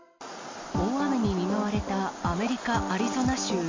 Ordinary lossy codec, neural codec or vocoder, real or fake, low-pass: MP3, 48 kbps; none; real; 7.2 kHz